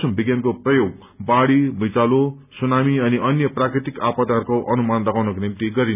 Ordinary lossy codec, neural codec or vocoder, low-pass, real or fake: none; none; 3.6 kHz; real